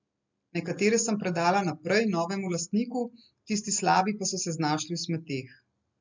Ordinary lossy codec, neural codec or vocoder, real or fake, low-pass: MP3, 64 kbps; none; real; 7.2 kHz